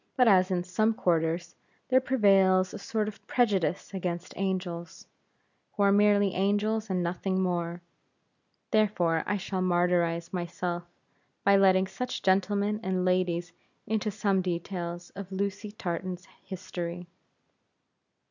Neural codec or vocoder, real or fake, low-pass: none; real; 7.2 kHz